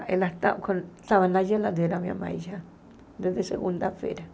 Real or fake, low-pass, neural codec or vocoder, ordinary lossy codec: real; none; none; none